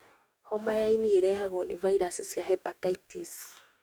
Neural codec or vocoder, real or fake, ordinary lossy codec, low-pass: codec, 44.1 kHz, 2.6 kbps, DAC; fake; none; none